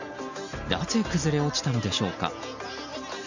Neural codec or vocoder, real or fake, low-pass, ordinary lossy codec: none; real; 7.2 kHz; none